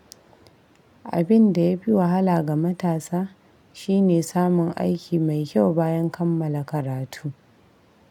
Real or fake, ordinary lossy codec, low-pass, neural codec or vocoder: real; none; 19.8 kHz; none